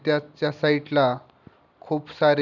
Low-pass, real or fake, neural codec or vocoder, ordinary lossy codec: 7.2 kHz; real; none; none